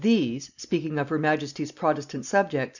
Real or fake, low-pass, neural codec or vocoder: real; 7.2 kHz; none